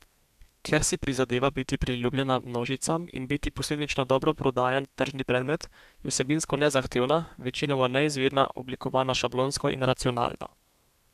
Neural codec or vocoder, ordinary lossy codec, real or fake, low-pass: codec, 32 kHz, 1.9 kbps, SNAC; none; fake; 14.4 kHz